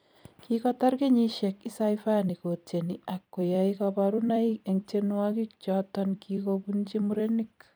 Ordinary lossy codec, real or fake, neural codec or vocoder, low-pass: none; fake; vocoder, 44.1 kHz, 128 mel bands every 256 samples, BigVGAN v2; none